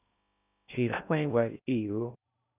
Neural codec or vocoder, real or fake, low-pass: codec, 16 kHz in and 24 kHz out, 0.6 kbps, FocalCodec, streaming, 2048 codes; fake; 3.6 kHz